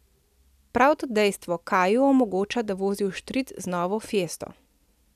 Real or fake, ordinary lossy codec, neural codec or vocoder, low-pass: real; none; none; 14.4 kHz